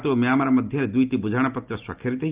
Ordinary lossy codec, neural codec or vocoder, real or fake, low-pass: Opus, 24 kbps; none; real; 3.6 kHz